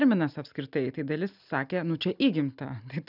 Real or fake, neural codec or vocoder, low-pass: real; none; 5.4 kHz